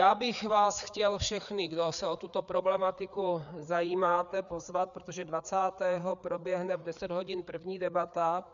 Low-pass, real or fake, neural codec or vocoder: 7.2 kHz; fake; codec, 16 kHz, 4 kbps, FreqCodec, larger model